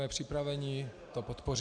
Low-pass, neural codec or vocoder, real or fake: 9.9 kHz; none; real